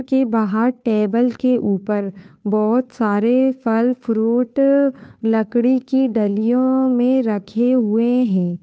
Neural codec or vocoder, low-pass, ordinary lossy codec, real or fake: codec, 16 kHz, 2 kbps, FunCodec, trained on Chinese and English, 25 frames a second; none; none; fake